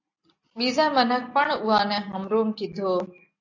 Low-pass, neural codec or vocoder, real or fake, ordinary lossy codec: 7.2 kHz; none; real; AAC, 48 kbps